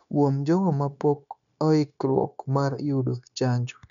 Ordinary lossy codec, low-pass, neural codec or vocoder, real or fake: none; 7.2 kHz; codec, 16 kHz, 0.9 kbps, LongCat-Audio-Codec; fake